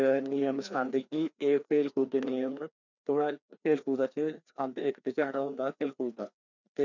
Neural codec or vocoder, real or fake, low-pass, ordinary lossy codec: codec, 16 kHz, 2 kbps, FreqCodec, larger model; fake; 7.2 kHz; none